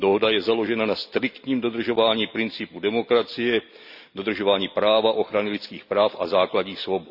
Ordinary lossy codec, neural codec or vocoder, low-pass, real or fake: none; none; 5.4 kHz; real